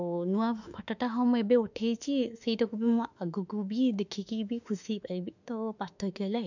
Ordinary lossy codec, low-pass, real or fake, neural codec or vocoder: none; 7.2 kHz; fake; autoencoder, 48 kHz, 32 numbers a frame, DAC-VAE, trained on Japanese speech